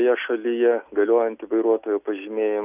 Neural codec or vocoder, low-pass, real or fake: none; 3.6 kHz; real